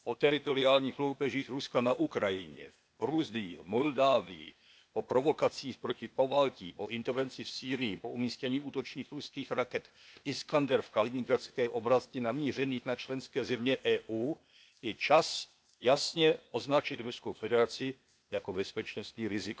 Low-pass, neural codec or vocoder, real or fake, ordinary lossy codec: none; codec, 16 kHz, 0.8 kbps, ZipCodec; fake; none